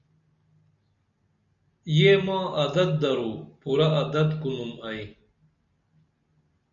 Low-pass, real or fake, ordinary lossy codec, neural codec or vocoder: 7.2 kHz; real; MP3, 64 kbps; none